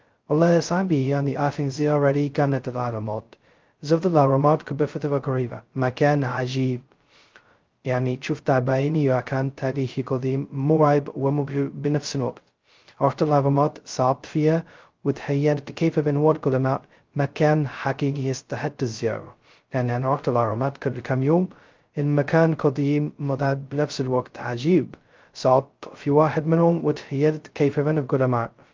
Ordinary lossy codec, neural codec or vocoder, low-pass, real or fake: Opus, 32 kbps; codec, 16 kHz, 0.2 kbps, FocalCodec; 7.2 kHz; fake